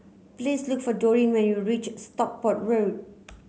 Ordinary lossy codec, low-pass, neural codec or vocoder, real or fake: none; none; none; real